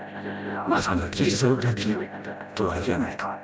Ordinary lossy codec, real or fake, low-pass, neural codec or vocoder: none; fake; none; codec, 16 kHz, 0.5 kbps, FreqCodec, smaller model